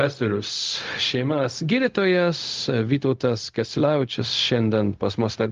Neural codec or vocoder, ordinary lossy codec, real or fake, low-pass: codec, 16 kHz, 0.4 kbps, LongCat-Audio-Codec; Opus, 32 kbps; fake; 7.2 kHz